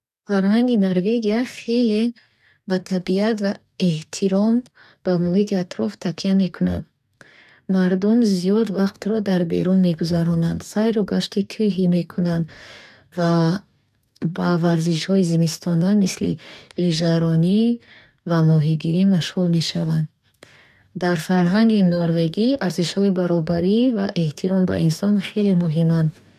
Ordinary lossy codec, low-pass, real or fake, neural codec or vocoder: none; 14.4 kHz; fake; codec, 44.1 kHz, 2.6 kbps, DAC